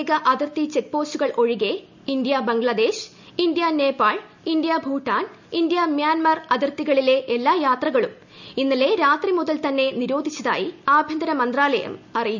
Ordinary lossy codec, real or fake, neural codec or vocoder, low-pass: none; real; none; 7.2 kHz